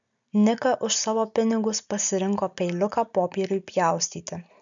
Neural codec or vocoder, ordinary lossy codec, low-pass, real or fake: none; MP3, 96 kbps; 7.2 kHz; real